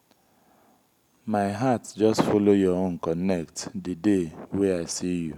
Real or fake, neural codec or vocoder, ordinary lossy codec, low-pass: real; none; none; 19.8 kHz